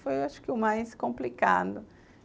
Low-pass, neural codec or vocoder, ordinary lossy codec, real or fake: none; none; none; real